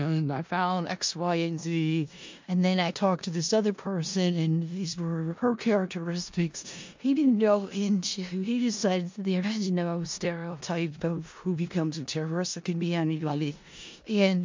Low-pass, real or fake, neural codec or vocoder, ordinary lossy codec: 7.2 kHz; fake; codec, 16 kHz in and 24 kHz out, 0.4 kbps, LongCat-Audio-Codec, four codebook decoder; MP3, 48 kbps